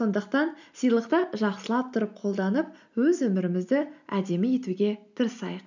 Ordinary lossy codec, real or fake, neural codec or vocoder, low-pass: none; real; none; 7.2 kHz